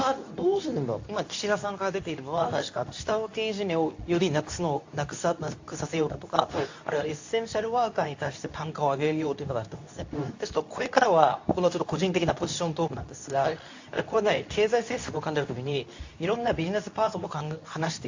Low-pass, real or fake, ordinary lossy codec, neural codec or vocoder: 7.2 kHz; fake; AAC, 48 kbps; codec, 24 kHz, 0.9 kbps, WavTokenizer, medium speech release version 2